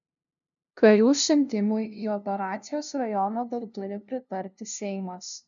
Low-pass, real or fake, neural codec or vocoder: 7.2 kHz; fake; codec, 16 kHz, 0.5 kbps, FunCodec, trained on LibriTTS, 25 frames a second